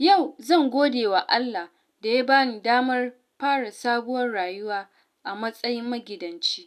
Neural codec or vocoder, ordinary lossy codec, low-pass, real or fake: none; none; 14.4 kHz; real